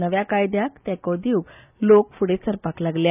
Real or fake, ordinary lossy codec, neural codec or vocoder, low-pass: real; none; none; 3.6 kHz